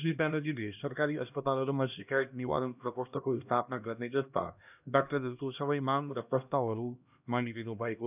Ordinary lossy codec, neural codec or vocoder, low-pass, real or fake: none; codec, 16 kHz, 1 kbps, X-Codec, HuBERT features, trained on LibriSpeech; 3.6 kHz; fake